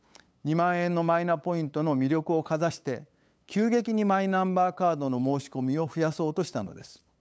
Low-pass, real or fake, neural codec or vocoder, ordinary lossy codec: none; fake; codec, 16 kHz, 8 kbps, FunCodec, trained on LibriTTS, 25 frames a second; none